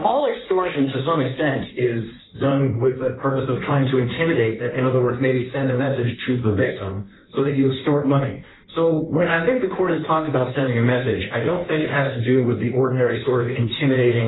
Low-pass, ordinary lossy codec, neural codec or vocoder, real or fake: 7.2 kHz; AAC, 16 kbps; codec, 16 kHz in and 24 kHz out, 1.1 kbps, FireRedTTS-2 codec; fake